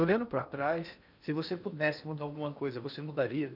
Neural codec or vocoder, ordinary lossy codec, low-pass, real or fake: codec, 16 kHz in and 24 kHz out, 0.8 kbps, FocalCodec, streaming, 65536 codes; none; 5.4 kHz; fake